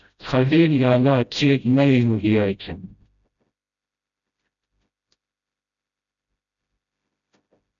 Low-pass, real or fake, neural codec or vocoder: 7.2 kHz; fake; codec, 16 kHz, 0.5 kbps, FreqCodec, smaller model